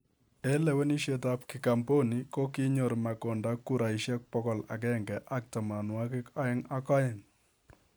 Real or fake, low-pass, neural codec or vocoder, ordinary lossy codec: real; none; none; none